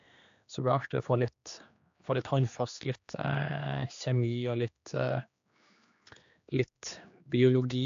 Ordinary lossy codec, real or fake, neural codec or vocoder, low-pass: AAC, 64 kbps; fake; codec, 16 kHz, 2 kbps, X-Codec, HuBERT features, trained on general audio; 7.2 kHz